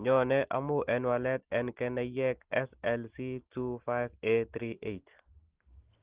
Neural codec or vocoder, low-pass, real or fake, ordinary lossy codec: none; 3.6 kHz; real; Opus, 16 kbps